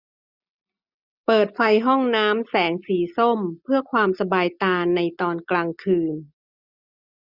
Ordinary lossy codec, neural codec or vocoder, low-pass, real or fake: none; none; 5.4 kHz; real